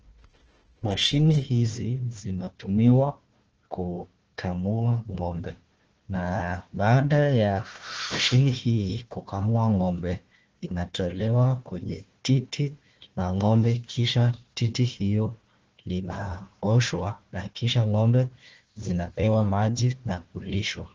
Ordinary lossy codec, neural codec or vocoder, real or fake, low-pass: Opus, 16 kbps; codec, 16 kHz, 1 kbps, FunCodec, trained on Chinese and English, 50 frames a second; fake; 7.2 kHz